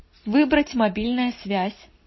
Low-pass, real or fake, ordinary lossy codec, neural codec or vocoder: 7.2 kHz; real; MP3, 24 kbps; none